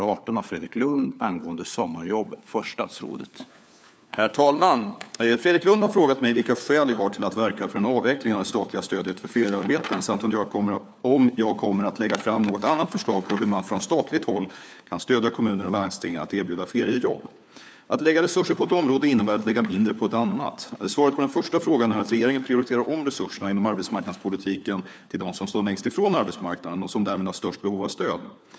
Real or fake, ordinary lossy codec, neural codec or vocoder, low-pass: fake; none; codec, 16 kHz, 4 kbps, FunCodec, trained on LibriTTS, 50 frames a second; none